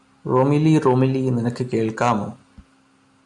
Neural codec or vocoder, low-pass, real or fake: none; 10.8 kHz; real